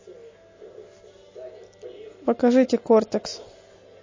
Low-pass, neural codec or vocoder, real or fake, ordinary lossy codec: 7.2 kHz; none; real; MP3, 32 kbps